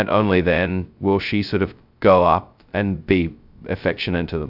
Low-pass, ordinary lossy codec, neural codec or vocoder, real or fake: 5.4 kHz; AAC, 48 kbps; codec, 16 kHz, 0.2 kbps, FocalCodec; fake